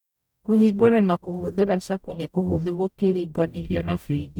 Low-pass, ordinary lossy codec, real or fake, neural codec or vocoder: 19.8 kHz; none; fake; codec, 44.1 kHz, 0.9 kbps, DAC